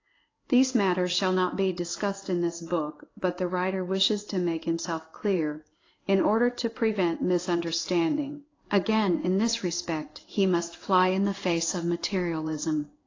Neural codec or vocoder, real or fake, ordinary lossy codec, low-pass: codec, 16 kHz in and 24 kHz out, 1 kbps, XY-Tokenizer; fake; AAC, 32 kbps; 7.2 kHz